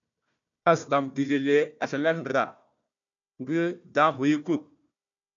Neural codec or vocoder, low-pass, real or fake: codec, 16 kHz, 1 kbps, FunCodec, trained on Chinese and English, 50 frames a second; 7.2 kHz; fake